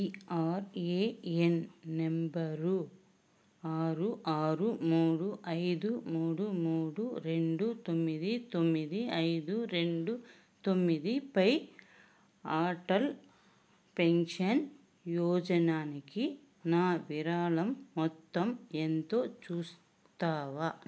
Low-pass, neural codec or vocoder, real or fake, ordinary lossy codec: none; none; real; none